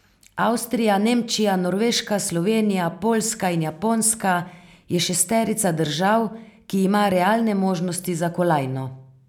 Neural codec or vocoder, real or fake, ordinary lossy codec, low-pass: none; real; none; 19.8 kHz